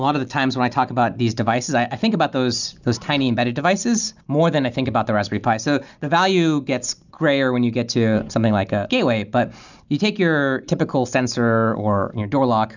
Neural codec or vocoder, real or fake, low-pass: none; real; 7.2 kHz